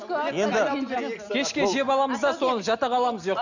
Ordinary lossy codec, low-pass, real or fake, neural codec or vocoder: none; 7.2 kHz; real; none